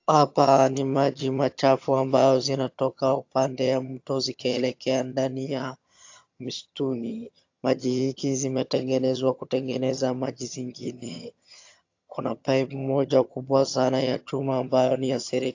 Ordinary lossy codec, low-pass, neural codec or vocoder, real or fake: AAC, 48 kbps; 7.2 kHz; vocoder, 22.05 kHz, 80 mel bands, HiFi-GAN; fake